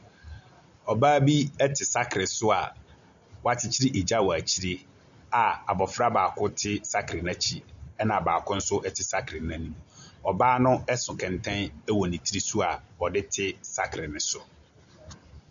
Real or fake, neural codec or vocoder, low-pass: real; none; 7.2 kHz